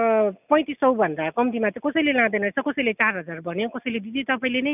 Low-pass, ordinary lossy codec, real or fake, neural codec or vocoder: 3.6 kHz; none; real; none